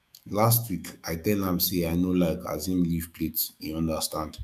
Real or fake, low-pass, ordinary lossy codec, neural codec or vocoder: fake; 14.4 kHz; none; autoencoder, 48 kHz, 128 numbers a frame, DAC-VAE, trained on Japanese speech